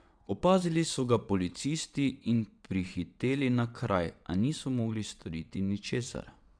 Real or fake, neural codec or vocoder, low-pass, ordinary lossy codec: fake; vocoder, 22.05 kHz, 80 mel bands, WaveNeXt; 9.9 kHz; none